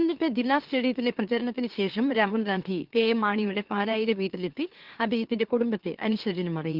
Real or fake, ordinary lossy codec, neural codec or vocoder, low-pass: fake; Opus, 16 kbps; autoencoder, 44.1 kHz, a latent of 192 numbers a frame, MeloTTS; 5.4 kHz